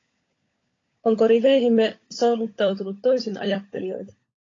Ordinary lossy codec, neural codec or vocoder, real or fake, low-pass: AAC, 32 kbps; codec, 16 kHz, 16 kbps, FunCodec, trained on LibriTTS, 50 frames a second; fake; 7.2 kHz